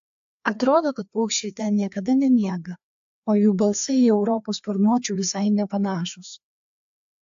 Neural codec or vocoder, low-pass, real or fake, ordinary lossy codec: codec, 16 kHz, 2 kbps, FreqCodec, larger model; 7.2 kHz; fake; AAC, 64 kbps